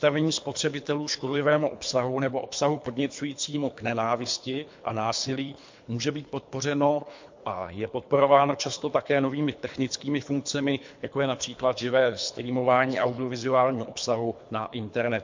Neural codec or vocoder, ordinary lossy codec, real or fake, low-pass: codec, 24 kHz, 3 kbps, HILCodec; MP3, 48 kbps; fake; 7.2 kHz